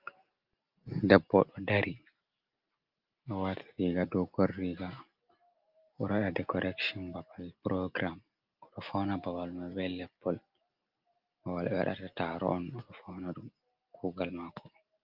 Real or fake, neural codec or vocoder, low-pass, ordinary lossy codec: real; none; 5.4 kHz; Opus, 24 kbps